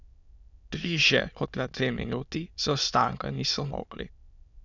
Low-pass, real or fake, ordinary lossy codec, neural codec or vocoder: 7.2 kHz; fake; none; autoencoder, 22.05 kHz, a latent of 192 numbers a frame, VITS, trained on many speakers